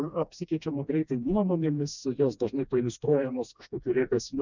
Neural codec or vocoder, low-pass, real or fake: codec, 16 kHz, 1 kbps, FreqCodec, smaller model; 7.2 kHz; fake